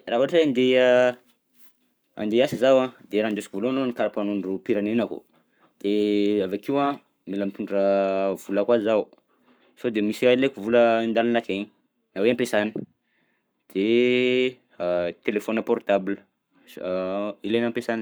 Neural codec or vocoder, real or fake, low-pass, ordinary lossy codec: codec, 44.1 kHz, 7.8 kbps, Pupu-Codec; fake; none; none